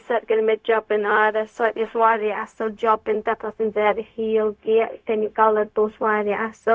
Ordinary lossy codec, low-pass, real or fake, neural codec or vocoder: none; none; fake; codec, 16 kHz, 0.4 kbps, LongCat-Audio-Codec